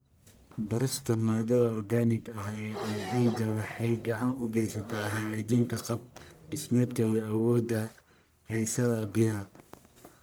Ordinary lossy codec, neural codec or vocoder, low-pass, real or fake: none; codec, 44.1 kHz, 1.7 kbps, Pupu-Codec; none; fake